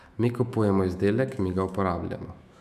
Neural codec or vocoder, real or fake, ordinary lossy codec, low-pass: autoencoder, 48 kHz, 128 numbers a frame, DAC-VAE, trained on Japanese speech; fake; none; 14.4 kHz